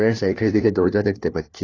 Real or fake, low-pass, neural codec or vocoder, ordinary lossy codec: fake; 7.2 kHz; codec, 16 kHz, 1 kbps, FunCodec, trained on LibriTTS, 50 frames a second; AAC, 32 kbps